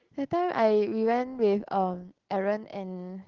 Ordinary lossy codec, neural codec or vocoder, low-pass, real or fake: Opus, 16 kbps; none; 7.2 kHz; real